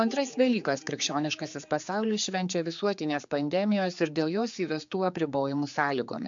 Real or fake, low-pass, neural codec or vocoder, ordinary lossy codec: fake; 7.2 kHz; codec, 16 kHz, 4 kbps, X-Codec, HuBERT features, trained on general audio; MP3, 64 kbps